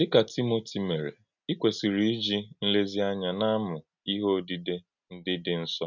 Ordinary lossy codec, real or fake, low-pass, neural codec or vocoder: none; real; 7.2 kHz; none